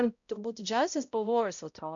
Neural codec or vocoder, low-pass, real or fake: codec, 16 kHz, 0.5 kbps, X-Codec, HuBERT features, trained on balanced general audio; 7.2 kHz; fake